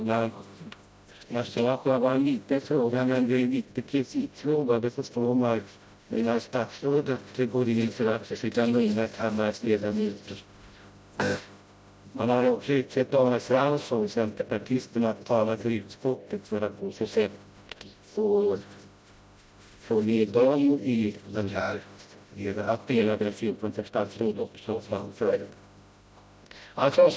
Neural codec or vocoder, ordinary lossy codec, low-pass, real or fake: codec, 16 kHz, 0.5 kbps, FreqCodec, smaller model; none; none; fake